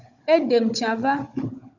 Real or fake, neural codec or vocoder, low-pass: fake; codec, 16 kHz, 16 kbps, FunCodec, trained on Chinese and English, 50 frames a second; 7.2 kHz